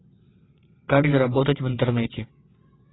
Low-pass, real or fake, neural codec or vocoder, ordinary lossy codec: 7.2 kHz; fake; vocoder, 22.05 kHz, 80 mel bands, WaveNeXt; AAC, 16 kbps